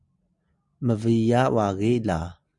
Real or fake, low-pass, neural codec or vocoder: real; 10.8 kHz; none